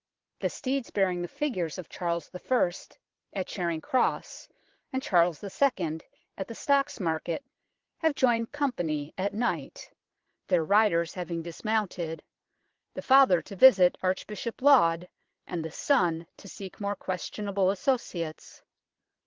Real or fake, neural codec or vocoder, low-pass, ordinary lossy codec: fake; vocoder, 22.05 kHz, 80 mel bands, Vocos; 7.2 kHz; Opus, 16 kbps